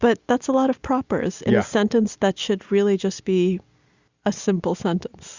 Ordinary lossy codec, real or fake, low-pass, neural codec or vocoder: Opus, 64 kbps; real; 7.2 kHz; none